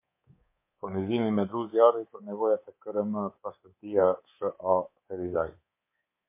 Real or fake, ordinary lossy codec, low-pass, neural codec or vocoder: fake; MP3, 24 kbps; 3.6 kHz; codec, 24 kHz, 3.1 kbps, DualCodec